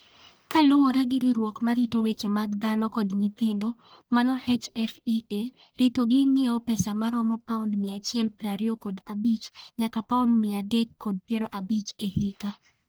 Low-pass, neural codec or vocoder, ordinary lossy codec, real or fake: none; codec, 44.1 kHz, 1.7 kbps, Pupu-Codec; none; fake